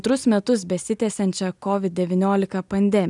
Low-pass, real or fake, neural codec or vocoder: 10.8 kHz; real; none